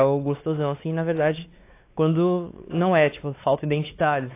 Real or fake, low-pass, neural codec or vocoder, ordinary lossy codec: fake; 3.6 kHz; codec, 16 kHz, 4 kbps, X-Codec, WavLM features, trained on Multilingual LibriSpeech; AAC, 24 kbps